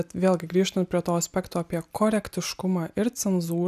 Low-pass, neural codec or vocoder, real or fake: 14.4 kHz; none; real